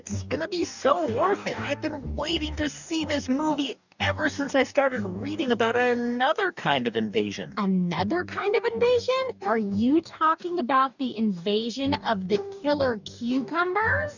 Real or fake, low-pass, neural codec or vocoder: fake; 7.2 kHz; codec, 44.1 kHz, 2.6 kbps, DAC